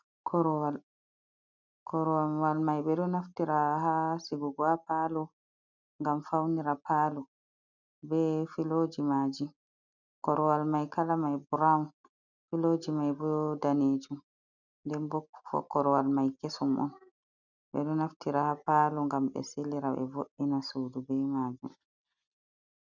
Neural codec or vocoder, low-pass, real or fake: none; 7.2 kHz; real